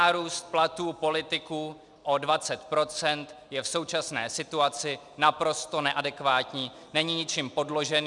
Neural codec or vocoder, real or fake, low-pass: none; real; 10.8 kHz